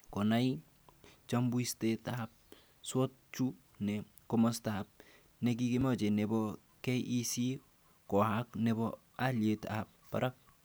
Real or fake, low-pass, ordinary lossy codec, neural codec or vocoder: real; none; none; none